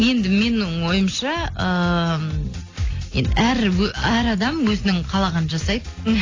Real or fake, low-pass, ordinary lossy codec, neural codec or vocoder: real; 7.2 kHz; AAC, 32 kbps; none